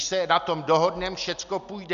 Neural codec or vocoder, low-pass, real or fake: none; 7.2 kHz; real